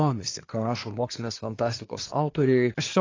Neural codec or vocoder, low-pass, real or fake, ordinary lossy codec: codec, 24 kHz, 1 kbps, SNAC; 7.2 kHz; fake; AAC, 32 kbps